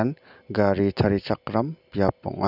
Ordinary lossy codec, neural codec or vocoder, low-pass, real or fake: none; none; 5.4 kHz; real